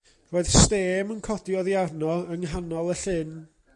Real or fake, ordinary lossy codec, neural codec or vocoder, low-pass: real; MP3, 48 kbps; none; 10.8 kHz